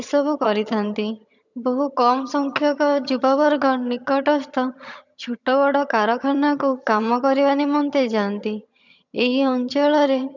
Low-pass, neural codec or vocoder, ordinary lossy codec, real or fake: 7.2 kHz; vocoder, 22.05 kHz, 80 mel bands, HiFi-GAN; none; fake